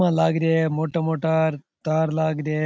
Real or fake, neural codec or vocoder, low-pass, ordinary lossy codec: real; none; none; none